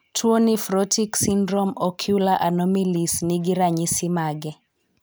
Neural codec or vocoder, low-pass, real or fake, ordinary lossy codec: none; none; real; none